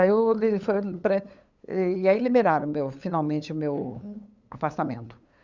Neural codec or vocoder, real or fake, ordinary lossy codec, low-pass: codec, 16 kHz, 8 kbps, FunCodec, trained on LibriTTS, 25 frames a second; fake; Opus, 64 kbps; 7.2 kHz